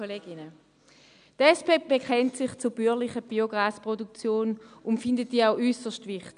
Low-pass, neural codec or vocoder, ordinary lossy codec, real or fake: 9.9 kHz; none; none; real